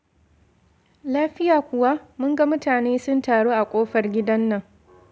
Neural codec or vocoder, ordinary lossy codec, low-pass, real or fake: none; none; none; real